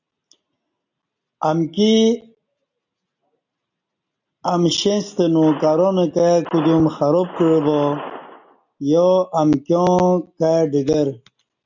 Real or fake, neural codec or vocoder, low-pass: real; none; 7.2 kHz